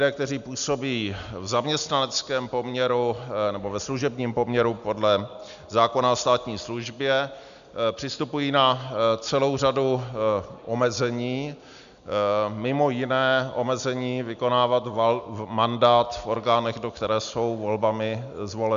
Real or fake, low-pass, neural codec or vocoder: real; 7.2 kHz; none